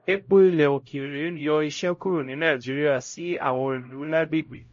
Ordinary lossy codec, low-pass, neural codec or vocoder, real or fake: MP3, 32 kbps; 7.2 kHz; codec, 16 kHz, 0.5 kbps, X-Codec, HuBERT features, trained on LibriSpeech; fake